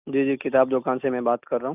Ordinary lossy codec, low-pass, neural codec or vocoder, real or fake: none; 3.6 kHz; none; real